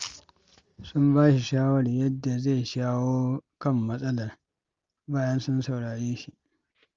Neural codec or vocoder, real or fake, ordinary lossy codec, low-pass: none; real; Opus, 32 kbps; 7.2 kHz